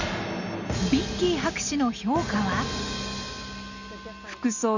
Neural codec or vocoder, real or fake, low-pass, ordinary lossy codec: none; real; 7.2 kHz; none